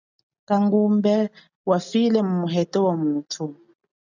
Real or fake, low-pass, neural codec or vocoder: real; 7.2 kHz; none